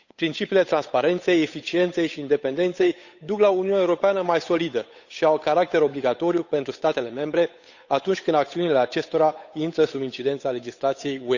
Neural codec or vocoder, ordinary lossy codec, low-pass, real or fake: codec, 16 kHz, 8 kbps, FunCodec, trained on Chinese and English, 25 frames a second; none; 7.2 kHz; fake